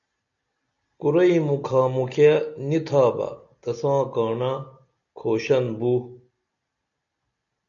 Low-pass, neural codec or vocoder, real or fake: 7.2 kHz; none; real